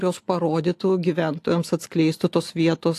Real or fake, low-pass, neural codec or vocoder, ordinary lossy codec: real; 14.4 kHz; none; AAC, 64 kbps